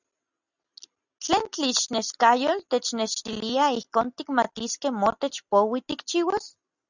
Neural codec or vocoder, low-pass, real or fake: none; 7.2 kHz; real